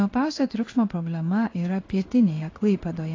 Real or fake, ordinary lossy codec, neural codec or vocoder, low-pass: fake; AAC, 32 kbps; codec, 16 kHz in and 24 kHz out, 1 kbps, XY-Tokenizer; 7.2 kHz